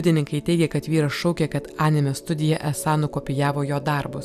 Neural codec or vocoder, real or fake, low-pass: none; real; 14.4 kHz